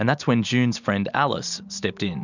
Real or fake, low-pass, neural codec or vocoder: real; 7.2 kHz; none